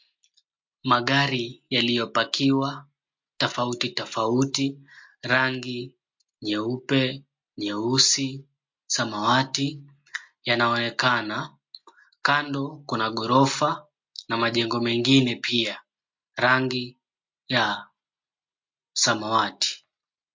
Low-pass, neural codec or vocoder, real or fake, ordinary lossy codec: 7.2 kHz; none; real; MP3, 48 kbps